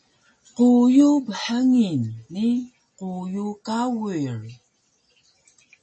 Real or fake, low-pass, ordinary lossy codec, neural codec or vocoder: real; 9.9 kHz; MP3, 32 kbps; none